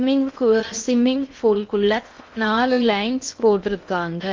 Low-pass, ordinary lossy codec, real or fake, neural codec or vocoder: 7.2 kHz; Opus, 24 kbps; fake; codec, 16 kHz in and 24 kHz out, 0.6 kbps, FocalCodec, streaming, 4096 codes